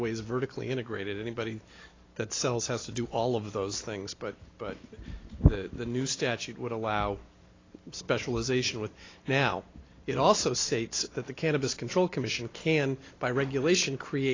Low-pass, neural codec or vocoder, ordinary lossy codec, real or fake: 7.2 kHz; none; AAC, 32 kbps; real